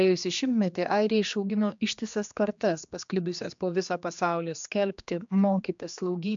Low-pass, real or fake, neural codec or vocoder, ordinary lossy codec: 7.2 kHz; fake; codec, 16 kHz, 2 kbps, X-Codec, HuBERT features, trained on general audio; MP3, 64 kbps